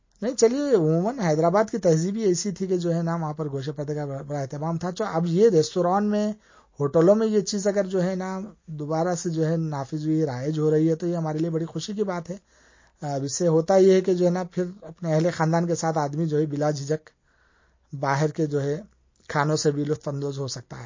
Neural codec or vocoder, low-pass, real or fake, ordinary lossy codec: none; 7.2 kHz; real; MP3, 32 kbps